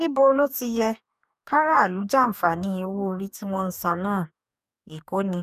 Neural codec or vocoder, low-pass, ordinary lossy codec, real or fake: codec, 44.1 kHz, 2.6 kbps, DAC; 14.4 kHz; none; fake